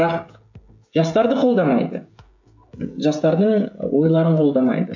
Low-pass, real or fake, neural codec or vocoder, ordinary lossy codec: 7.2 kHz; fake; codec, 16 kHz, 16 kbps, FreqCodec, smaller model; AAC, 48 kbps